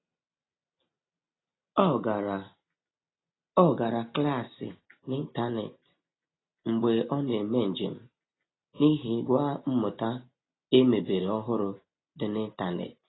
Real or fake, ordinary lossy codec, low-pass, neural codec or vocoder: real; AAC, 16 kbps; 7.2 kHz; none